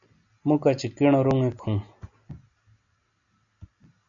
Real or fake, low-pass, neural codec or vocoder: real; 7.2 kHz; none